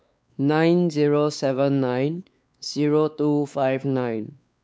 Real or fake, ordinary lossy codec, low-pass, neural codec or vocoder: fake; none; none; codec, 16 kHz, 4 kbps, X-Codec, WavLM features, trained on Multilingual LibriSpeech